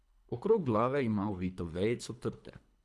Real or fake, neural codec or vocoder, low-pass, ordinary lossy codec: fake; codec, 24 kHz, 3 kbps, HILCodec; none; none